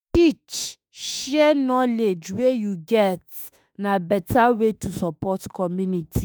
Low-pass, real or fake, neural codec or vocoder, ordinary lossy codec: none; fake; autoencoder, 48 kHz, 32 numbers a frame, DAC-VAE, trained on Japanese speech; none